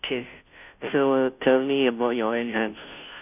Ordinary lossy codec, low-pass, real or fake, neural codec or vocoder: none; 3.6 kHz; fake; codec, 16 kHz, 0.5 kbps, FunCodec, trained on Chinese and English, 25 frames a second